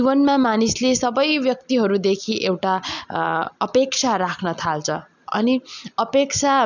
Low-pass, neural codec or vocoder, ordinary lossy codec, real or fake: 7.2 kHz; none; none; real